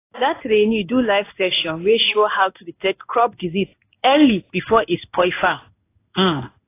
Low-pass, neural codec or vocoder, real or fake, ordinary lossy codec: 3.6 kHz; codec, 16 kHz in and 24 kHz out, 1 kbps, XY-Tokenizer; fake; AAC, 24 kbps